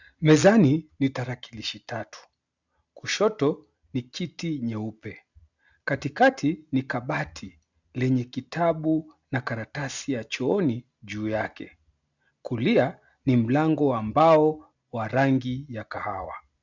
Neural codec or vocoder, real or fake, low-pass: none; real; 7.2 kHz